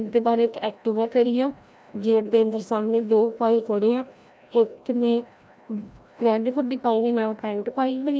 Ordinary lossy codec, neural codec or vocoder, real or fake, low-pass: none; codec, 16 kHz, 0.5 kbps, FreqCodec, larger model; fake; none